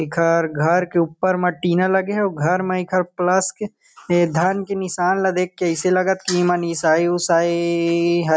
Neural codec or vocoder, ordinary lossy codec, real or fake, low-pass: none; none; real; none